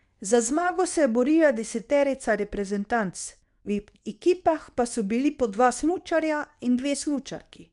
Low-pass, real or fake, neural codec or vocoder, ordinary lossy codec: 10.8 kHz; fake; codec, 24 kHz, 0.9 kbps, WavTokenizer, medium speech release version 2; none